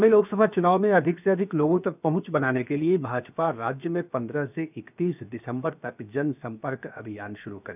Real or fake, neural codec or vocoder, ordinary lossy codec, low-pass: fake; codec, 16 kHz, 0.7 kbps, FocalCodec; none; 3.6 kHz